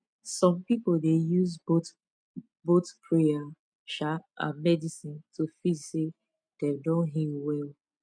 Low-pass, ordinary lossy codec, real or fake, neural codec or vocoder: 9.9 kHz; MP3, 96 kbps; real; none